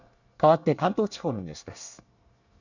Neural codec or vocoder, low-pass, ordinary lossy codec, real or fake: codec, 24 kHz, 1 kbps, SNAC; 7.2 kHz; none; fake